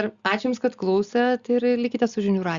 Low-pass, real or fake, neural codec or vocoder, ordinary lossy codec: 7.2 kHz; real; none; Opus, 64 kbps